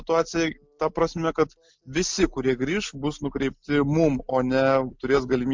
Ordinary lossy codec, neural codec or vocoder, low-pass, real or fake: MP3, 64 kbps; none; 7.2 kHz; real